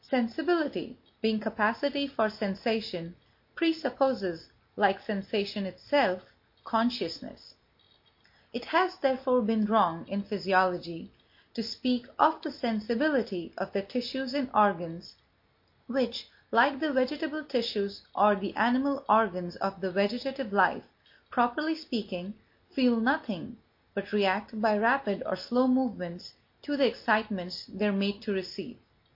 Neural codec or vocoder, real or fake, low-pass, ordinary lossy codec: none; real; 5.4 kHz; MP3, 32 kbps